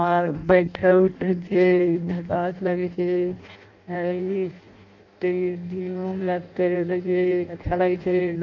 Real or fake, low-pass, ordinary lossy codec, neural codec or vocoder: fake; 7.2 kHz; none; codec, 16 kHz in and 24 kHz out, 0.6 kbps, FireRedTTS-2 codec